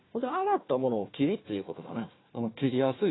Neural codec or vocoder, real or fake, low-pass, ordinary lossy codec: codec, 16 kHz, 1 kbps, FunCodec, trained on Chinese and English, 50 frames a second; fake; 7.2 kHz; AAC, 16 kbps